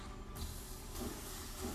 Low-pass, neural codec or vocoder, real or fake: 14.4 kHz; codec, 44.1 kHz, 7.8 kbps, Pupu-Codec; fake